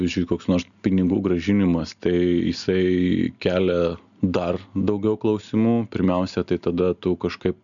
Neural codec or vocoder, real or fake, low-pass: none; real; 7.2 kHz